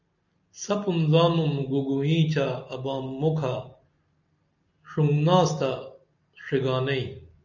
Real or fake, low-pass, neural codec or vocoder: real; 7.2 kHz; none